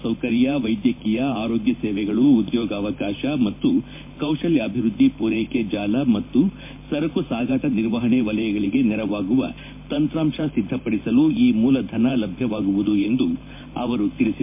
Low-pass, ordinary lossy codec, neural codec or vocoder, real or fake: 3.6 kHz; MP3, 24 kbps; vocoder, 44.1 kHz, 128 mel bands every 512 samples, BigVGAN v2; fake